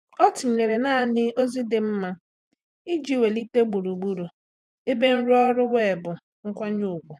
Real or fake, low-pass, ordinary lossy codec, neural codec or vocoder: fake; none; none; vocoder, 24 kHz, 100 mel bands, Vocos